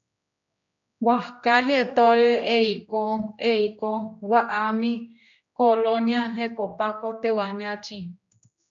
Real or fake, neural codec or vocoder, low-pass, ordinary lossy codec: fake; codec, 16 kHz, 1 kbps, X-Codec, HuBERT features, trained on general audio; 7.2 kHz; MP3, 96 kbps